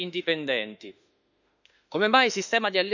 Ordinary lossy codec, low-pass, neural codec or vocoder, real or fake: none; 7.2 kHz; autoencoder, 48 kHz, 32 numbers a frame, DAC-VAE, trained on Japanese speech; fake